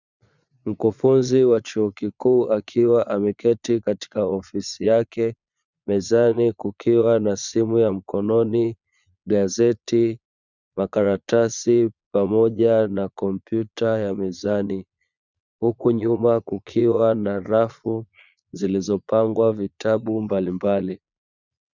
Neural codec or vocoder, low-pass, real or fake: vocoder, 22.05 kHz, 80 mel bands, Vocos; 7.2 kHz; fake